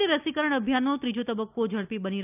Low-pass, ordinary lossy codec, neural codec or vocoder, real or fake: 3.6 kHz; none; none; real